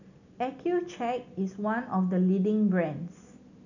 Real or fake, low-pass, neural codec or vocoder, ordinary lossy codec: real; 7.2 kHz; none; none